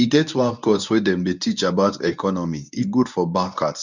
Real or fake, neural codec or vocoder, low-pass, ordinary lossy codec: fake; codec, 24 kHz, 0.9 kbps, WavTokenizer, medium speech release version 1; 7.2 kHz; none